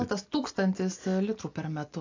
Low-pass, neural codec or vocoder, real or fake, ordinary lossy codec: 7.2 kHz; none; real; AAC, 48 kbps